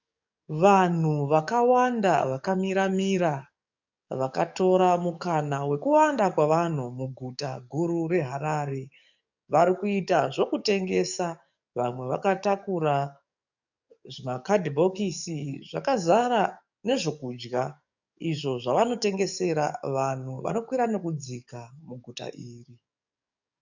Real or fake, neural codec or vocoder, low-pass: fake; codec, 44.1 kHz, 7.8 kbps, DAC; 7.2 kHz